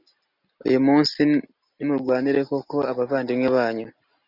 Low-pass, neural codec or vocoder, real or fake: 5.4 kHz; none; real